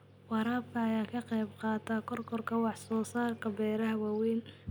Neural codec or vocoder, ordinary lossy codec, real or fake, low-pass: none; none; real; none